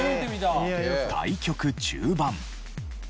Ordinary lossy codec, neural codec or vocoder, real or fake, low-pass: none; none; real; none